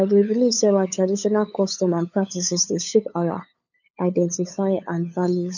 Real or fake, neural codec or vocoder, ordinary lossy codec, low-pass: fake; codec, 16 kHz, 8 kbps, FunCodec, trained on LibriTTS, 25 frames a second; none; 7.2 kHz